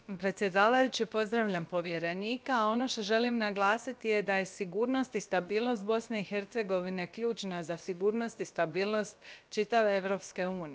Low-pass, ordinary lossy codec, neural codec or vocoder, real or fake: none; none; codec, 16 kHz, about 1 kbps, DyCAST, with the encoder's durations; fake